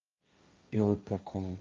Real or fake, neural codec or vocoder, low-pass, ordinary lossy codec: fake; codec, 16 kHz, 1.1 kbps, Voila-Tokenizer; 7.2 kHz; Opus, 32 kbps